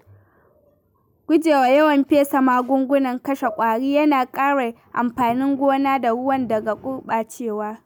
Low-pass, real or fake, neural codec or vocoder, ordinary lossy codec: none; real; none; none